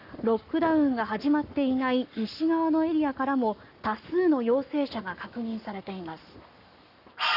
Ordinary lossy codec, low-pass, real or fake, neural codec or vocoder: none; 5.4 kHz; fake; codec, 44.1 kHz, 7.8 kbps, Pupu-Codec